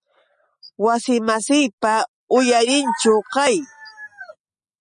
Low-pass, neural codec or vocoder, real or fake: 9.9 kHz; none; real